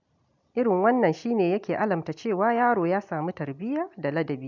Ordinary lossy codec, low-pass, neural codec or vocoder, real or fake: none; 7.2 kHz; none; real